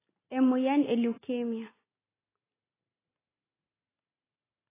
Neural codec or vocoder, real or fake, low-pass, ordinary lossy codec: none; real; 3.6 kHz; AAC, 16 kbps